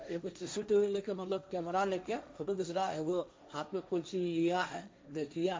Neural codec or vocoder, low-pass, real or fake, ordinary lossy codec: codec, 16 kHz, 1.1 kbps, Voila-Tokenizer; none; fake; none